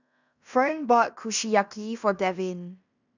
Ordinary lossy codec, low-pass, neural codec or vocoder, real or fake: none; 7.2 kHz; codec, 16 kHz in and 24 kHz out, 0.9 kbps, LongCat-Audio-Codec, fine tuned four codebook decoder; fake